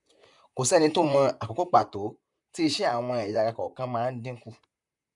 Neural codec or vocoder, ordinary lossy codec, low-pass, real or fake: vocoder, 44.1 kHz, 128 mel bands, Pupu-Vocoder; none; 10.8 kHz; fake